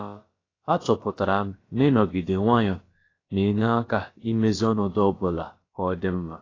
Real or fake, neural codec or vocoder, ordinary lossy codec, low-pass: fake; codec, 16 kHz, about 1 kbps, DyCAST, with the encoder's durations; AAC, 32 kbps; 7.2 kHz